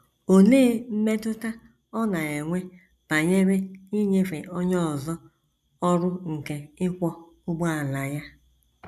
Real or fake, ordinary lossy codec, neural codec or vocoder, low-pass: real; none; none; 14.4 kHz